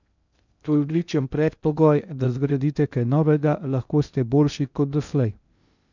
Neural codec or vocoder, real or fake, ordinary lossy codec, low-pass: codec, 16 kHz in and 24 kHz out, 0.6 kbps, FocalCodec, streaming, 2048 codes; fake; none; 7.2 kHz